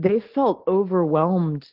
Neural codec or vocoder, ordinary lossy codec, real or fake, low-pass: none; Opus, 16 kbps; real; 5.4 kHz